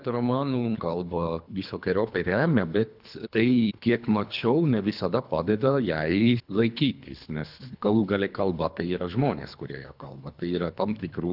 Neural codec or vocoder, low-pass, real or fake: codec, 24 kHz, 3 kbps, HILCodec; 5.4 kHz; fake